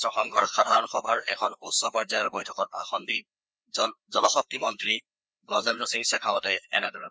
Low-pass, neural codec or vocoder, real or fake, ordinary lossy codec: none; codec, 16 kHz, 2 kbps, FreqCodec, larger model; fake; none